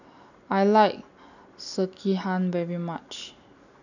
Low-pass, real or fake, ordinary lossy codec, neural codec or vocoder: 7.2 kHz; real; none; none